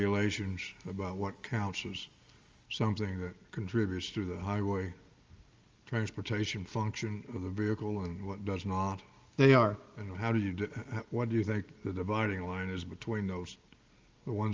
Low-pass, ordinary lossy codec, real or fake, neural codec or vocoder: 7.2 kHz; Opus, 32 kbps; real; none